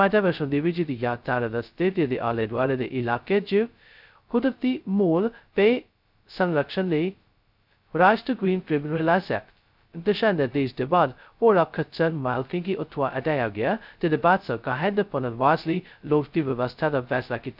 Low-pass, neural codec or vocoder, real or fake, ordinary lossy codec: 5.4 kHz; codec, 16 kHz, 0.2 kbps, FocalCodec; fake; none